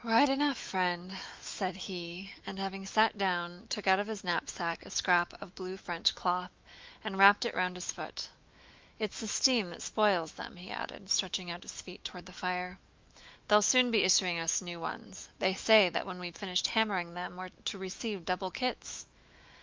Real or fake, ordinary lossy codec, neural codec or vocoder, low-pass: fake; Opus, 24 kbps; autoencoder, 48 kHz, 128 numbers a frame, DAC-VAE, trained on Japanese speech; 7.2 kHz